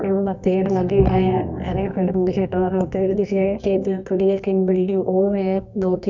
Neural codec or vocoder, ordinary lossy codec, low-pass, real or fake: codec, 24 kHz, 0.9 kbps, WavTokenizer, medium music audio release; none; 7.2 kHz; fake